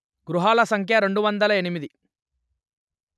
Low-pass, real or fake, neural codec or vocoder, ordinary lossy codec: none; real; none; none